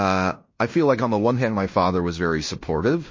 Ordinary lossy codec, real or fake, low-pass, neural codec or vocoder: MP3, 32 kbps; fake; 7.2 kHz; codec, 16 kHz in and 24 kHz out, 0.9 kbps, LongCat-Audio-Codec, fine tuned four codebook decoder